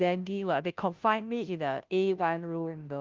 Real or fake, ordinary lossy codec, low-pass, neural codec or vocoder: fake; Opus, 32 kbps; 7.2 kHz; codec, 16 kHz, 0.5 kbps, FunCodec, trained on Chinese and English, 25 frames a second